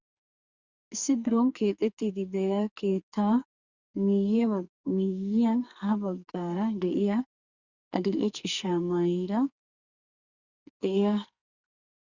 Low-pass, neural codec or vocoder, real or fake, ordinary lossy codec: 7.2 kHz; codec, 44.1 kHz, 2.6 kbps, SNAC; fake; Opus, 64 kbps